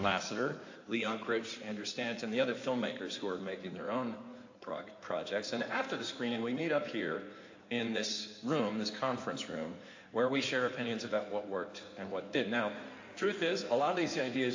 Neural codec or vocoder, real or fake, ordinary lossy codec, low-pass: codec, 16 kHz in and 24 kHz out, 2.2 kbps, FireRedTTS-2 codec; fake; AAC, 48 kbps; 7.2 kHz